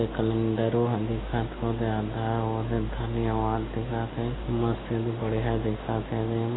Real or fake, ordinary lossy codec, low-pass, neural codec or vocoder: real; AAC, 16 kbps; 7.2 kHz; none